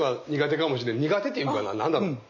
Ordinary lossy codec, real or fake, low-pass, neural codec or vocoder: none; real; 7.2 kHz; none